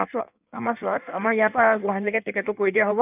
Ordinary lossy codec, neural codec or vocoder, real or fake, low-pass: none; codec, 16 kHz in and 24 kHz out, 1.1 kbps, FireRedTTS-2 codec; fake; 3.6 kHz